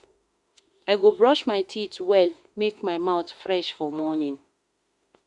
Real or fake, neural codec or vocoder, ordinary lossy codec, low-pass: fake; autoencoder, 48 kHz, 32 numbers a frame, DAC-VAE, trained on Japanese speech; Opus, 64 kbps; 10.8 kHz